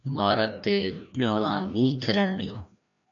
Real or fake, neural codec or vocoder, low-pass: fake; codec, 16 kHz, 1 kbps, FreqCodec, larger model; 7.2 kHz